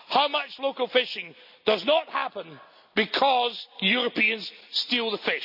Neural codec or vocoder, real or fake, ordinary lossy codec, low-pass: none; real; none; 5.4 kHz